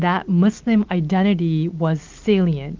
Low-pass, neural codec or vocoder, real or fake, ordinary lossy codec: 7.2 kHz; none; real; Opus, 24 kbps